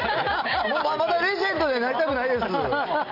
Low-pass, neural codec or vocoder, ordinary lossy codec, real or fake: 5.4 kHz; none; none; real